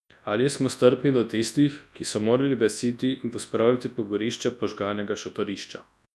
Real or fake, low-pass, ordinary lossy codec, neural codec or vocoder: fake; none; none; codec, 24 kHz, 0.9 kbps, WavTokenizer, large speech release